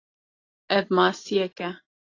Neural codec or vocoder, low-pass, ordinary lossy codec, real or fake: none; 7.2 kHz; AAC, 32 kbps; real